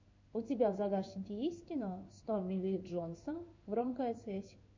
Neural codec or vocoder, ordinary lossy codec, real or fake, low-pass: codec, 16 kHz in and 24 kHz out, 1 kbps, XY-Tokenizer; MP3, 48 kbps; fake; 7.2 kHz